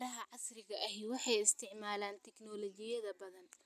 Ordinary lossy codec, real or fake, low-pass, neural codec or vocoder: none; real; 14.4 kHz; none